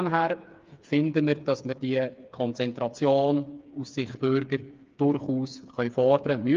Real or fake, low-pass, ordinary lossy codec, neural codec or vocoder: fake; 7.2 kHz; Opus, 24 kbps; codec, 16 kHz, 4 kbps, FreqCodec, smaller model